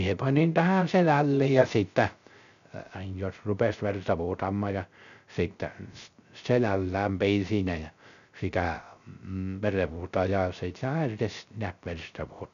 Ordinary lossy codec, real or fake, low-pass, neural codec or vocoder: none; fake; 7.2 kHz; codec, 16 kHz, 0.3 kbps, FocalCodec